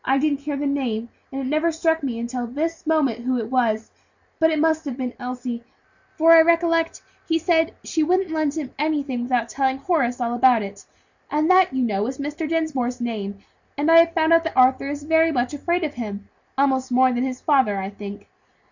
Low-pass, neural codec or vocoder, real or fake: 7.2 kHz; none; real